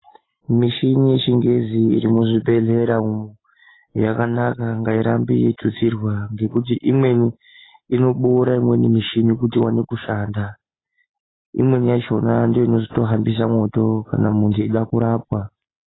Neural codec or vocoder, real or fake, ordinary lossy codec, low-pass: none; real; AAC, 16 kbps; 7.2 kHz